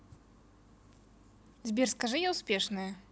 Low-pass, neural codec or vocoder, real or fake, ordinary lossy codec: none; none; real; none